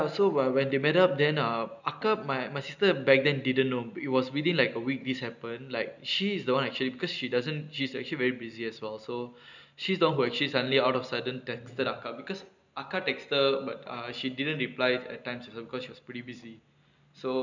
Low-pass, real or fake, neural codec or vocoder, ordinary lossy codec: 7.2 kHz; real; none; none